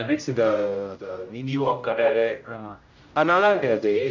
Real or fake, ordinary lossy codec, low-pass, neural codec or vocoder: fake; none; 7.2 kHz; codec, 16 kHz, 0.5 kbps, X-Codec, HuBERT features, trained on general audio